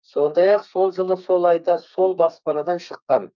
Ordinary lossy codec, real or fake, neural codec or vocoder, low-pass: none; fake; codec, 32 kHz, 1.9 kbps, SNAC; 7.2 kHz